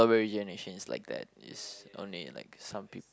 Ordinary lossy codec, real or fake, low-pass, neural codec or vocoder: none; real; none; none